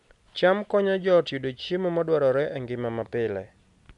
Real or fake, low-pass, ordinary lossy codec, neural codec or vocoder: real; 10.8 kHz; none; none